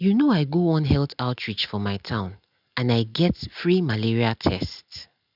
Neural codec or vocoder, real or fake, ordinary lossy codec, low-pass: none; real; none; 5.4 kHz